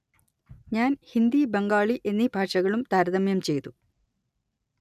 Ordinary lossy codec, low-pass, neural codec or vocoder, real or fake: none; 14.4 kHz; none; real